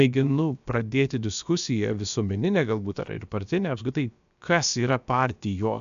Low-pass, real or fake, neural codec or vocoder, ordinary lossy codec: 7.2 kHz; fake; codec, 16 kHz, about 1 kbps, DyCAST, with the encoder's durations; AAC, 96 kbps